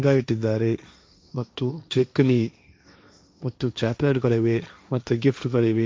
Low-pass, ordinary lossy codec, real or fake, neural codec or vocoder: none; none; fake; codec, 16 kHz, 1.1 kbps, Voila-Tokenizer